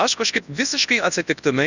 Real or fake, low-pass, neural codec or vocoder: fake; 7.2 kHz; codec, 24 kHz, 0.9 kbps, WavTokenizer, large speech release